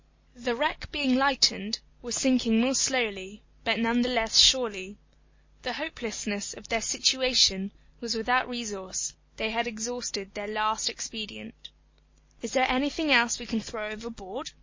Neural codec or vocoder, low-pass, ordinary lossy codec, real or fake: none; 7.2 kHz; MP3, 32 kbps; real